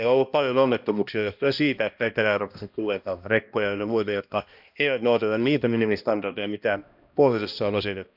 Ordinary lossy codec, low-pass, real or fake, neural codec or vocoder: none; 5.4 kHz; fake; codec, 16 kHz, 1 kbps, X-Codec, HuBERT features, trained on balanced general audio